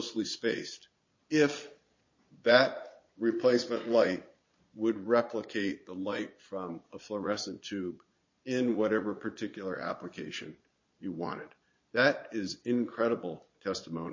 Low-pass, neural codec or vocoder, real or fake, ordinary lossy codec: 7.2 kHz; vocoder, 22.05 kHz, 80 mel bands, Vocos; fake; MP3, 32 kbps